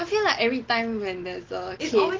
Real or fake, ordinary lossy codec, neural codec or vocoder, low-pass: real; Opus, 16 kbps; none; 7.2 kHz